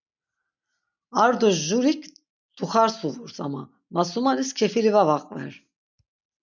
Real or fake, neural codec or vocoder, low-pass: real; none; 7.2 kHz